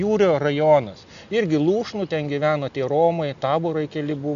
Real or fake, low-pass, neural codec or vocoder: real; 7.2 kHz; none